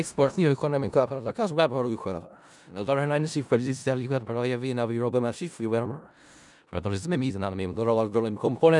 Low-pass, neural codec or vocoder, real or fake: 10.8 kHz; codec, 16 kHz in and 24 kHz out, 0.4 kbps, LongCat-Audio-Codec, four codebook decoder; fake